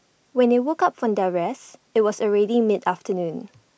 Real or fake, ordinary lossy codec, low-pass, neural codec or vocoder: real; none; none; none